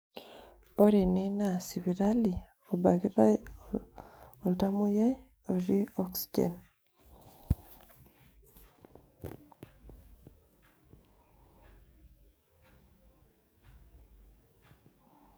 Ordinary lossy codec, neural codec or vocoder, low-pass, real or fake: none; codec, 44.1 kHz, 7.8 kbps, DAC; none; fake